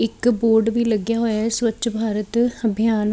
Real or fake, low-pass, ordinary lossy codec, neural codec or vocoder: real; none; none; none